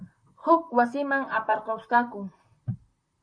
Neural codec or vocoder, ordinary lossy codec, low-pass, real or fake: vocoder, 44.1 kHz, 128 mel bands, Pupu-Vocoder; MP3, 48 kbps; 9.9 kHz; fake